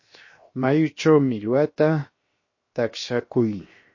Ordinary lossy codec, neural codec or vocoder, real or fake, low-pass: MP3, 32 kbps; codec, 16 kHz, 0.7 kbps, FocalCodec; fake; 7.2 kHz